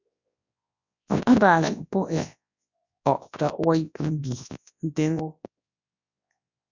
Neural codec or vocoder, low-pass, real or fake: codec, 24 kHz, 0.9 kbps, WavTokenizer, large speech release; 7.2 kHz; fake